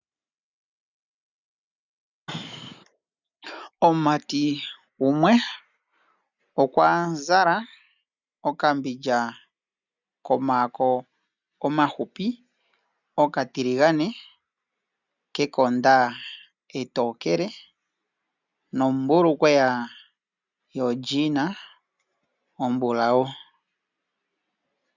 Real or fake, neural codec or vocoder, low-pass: real; none; 7.2 kHz